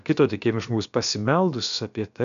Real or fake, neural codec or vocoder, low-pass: fake; codec, 16 kHz, about 1 kbps, DyCAST, with the encoder's durations; 7.2 kHz